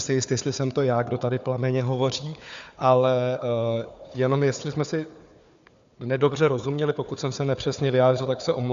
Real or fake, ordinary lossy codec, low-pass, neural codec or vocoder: fake; Opus, 64 kbps; 7.2 kHz; codec, 16 kHz, 4 kbps, FunCodec, trained on Chinese and English, 50 frames a second